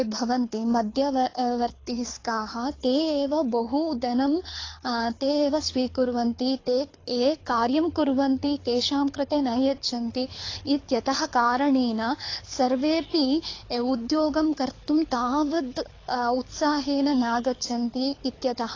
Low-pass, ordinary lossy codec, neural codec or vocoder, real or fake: 7.2 kHz; AAC, 32 kbps; codec, 24 kHz, 6 kbps, HILCodec; fake